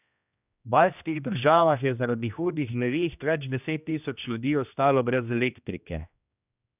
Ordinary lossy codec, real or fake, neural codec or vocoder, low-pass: none; fake; codec, 16 kHz, 1 kbps, X-Codec, HuBERT features, trained on general audio; 3.6 kHz